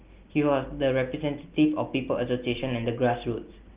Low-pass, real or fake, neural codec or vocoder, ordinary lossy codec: 3.6 kHz; real; none; Opus, 32 kbps